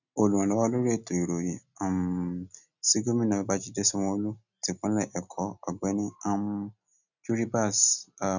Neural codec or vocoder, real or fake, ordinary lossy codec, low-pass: none; real; none; 7.2 kHz